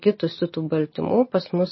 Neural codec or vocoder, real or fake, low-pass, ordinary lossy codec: none; real; 7.2 kHz; MP3, 24 kbps